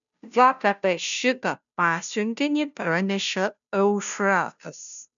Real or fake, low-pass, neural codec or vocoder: fake; 7.2 kHz; codec, 16 kHz, 0.5 kbps, FunCodec, trained on Chinese and English, 25 frames a second